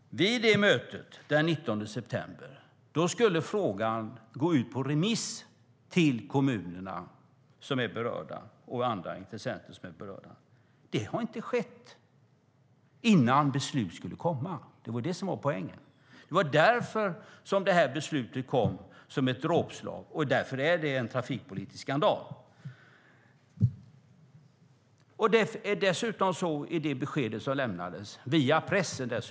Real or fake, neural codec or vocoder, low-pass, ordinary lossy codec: real; none; none; none